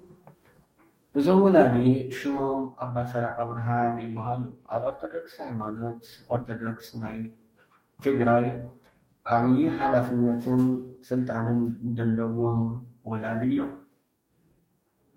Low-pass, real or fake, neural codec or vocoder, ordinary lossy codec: 19.8 kHz; fake; codec, 44.1 kHz, 2.6 kbps, DAC; MP3, 64 kbps